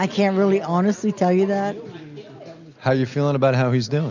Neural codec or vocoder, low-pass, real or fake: none; 7.2 kHz; real